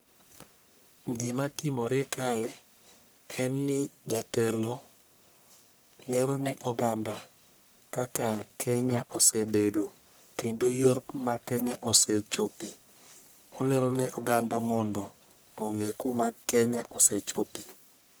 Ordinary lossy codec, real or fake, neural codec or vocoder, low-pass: none; fake; codec, 44.1 kHz, 1.7 kbps, Pupu-Codec; none